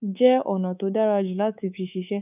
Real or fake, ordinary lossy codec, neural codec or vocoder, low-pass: fake; none; codec, 24 kHz, 3.1 kbps, DualCodec; 3.6 kHz